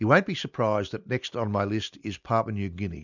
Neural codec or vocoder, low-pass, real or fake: none; 7.2 kHz; real